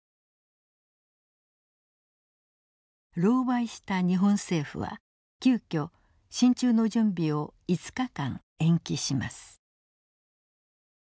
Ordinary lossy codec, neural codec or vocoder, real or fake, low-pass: none; none; real; none